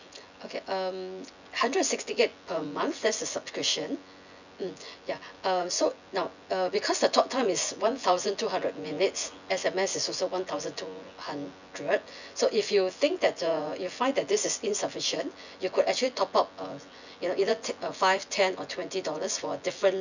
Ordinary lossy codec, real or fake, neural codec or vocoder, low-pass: none; fake; vocoder, 24 kHz, 100 mel bands, Vocos; 7.2 kHz